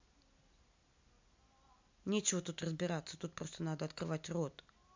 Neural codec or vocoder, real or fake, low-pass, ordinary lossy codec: none; real; 7.2 kHz; MP3, 64 kbps